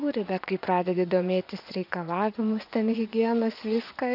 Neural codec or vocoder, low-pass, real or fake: vocoder, 24 kHz, 100 mel bands, Vocos; 5.4 kHz; fake